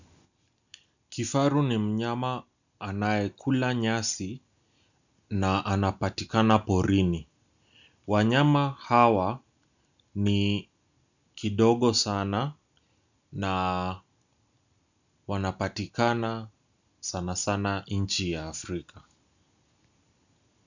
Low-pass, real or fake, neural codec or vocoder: 7.2 kHz; real; none